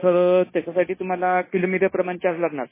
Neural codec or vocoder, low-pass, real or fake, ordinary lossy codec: codec, 16 kHz, 0.9 kbps, LongCat-Audio-Codec; 3.6 kHz; fake; MP3, 16 kbps